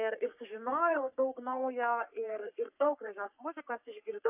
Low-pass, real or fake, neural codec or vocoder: 3.6 kHz; fake; codec, 44.1 kHz, 3.4 kbps, Pupu-Codec